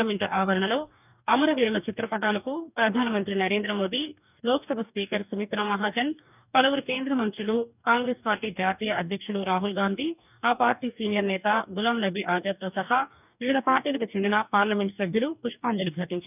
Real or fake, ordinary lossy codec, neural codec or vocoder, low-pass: fake; none; codec, 44.1 kHz, 2.6 kbps, DAC; 3.6 kHz